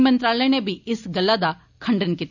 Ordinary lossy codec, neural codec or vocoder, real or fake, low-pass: none; none; real; 7.2 kHz